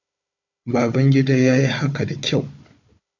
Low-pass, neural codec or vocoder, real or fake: 7.2 kHz; codec, 16 kHz, 4 kbps, FunCodec, trained on Chinese and English, 50 frames a second; fake